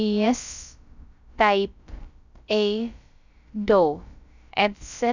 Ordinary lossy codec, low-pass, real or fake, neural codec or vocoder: none; 7.2 kHz; fake; codec, 16 kHz, about 1 kbps, DyCAST, with the encoder's durations